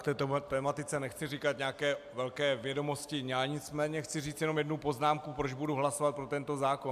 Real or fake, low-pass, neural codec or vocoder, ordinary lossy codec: real; 14.4 kHz; none; AAC, 96 kbps